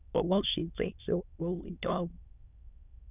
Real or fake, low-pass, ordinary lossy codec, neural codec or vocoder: fake; 3.6 kHz; none; autoencoder, 22.05 kHz, a latent of 192 numbers a frame, VITS, trained on many speakers